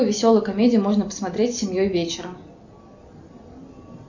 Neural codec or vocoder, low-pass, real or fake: none; 7.2 kHz; real